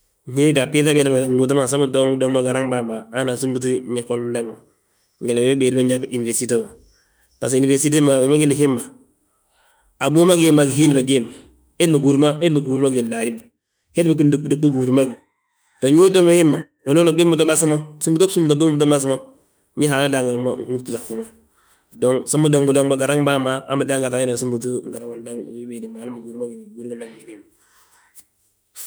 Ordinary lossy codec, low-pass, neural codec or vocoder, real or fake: none; none; autoencoder, 48 kHz, 32 numbers a frame, DAC-VAE, trained on Japanese speech; fake